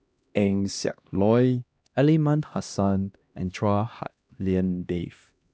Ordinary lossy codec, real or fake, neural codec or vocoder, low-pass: none; fake; codec, 16 kHz, 1 kbps, X-Codec, HuBERT features, trained on LibriSpeech; none